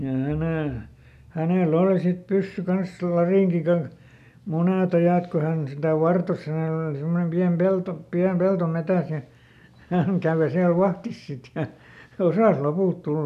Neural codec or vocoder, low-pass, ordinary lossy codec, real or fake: none; 14.4 kHz; none; real